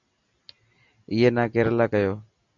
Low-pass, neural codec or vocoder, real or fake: 7.2 kHz; none; real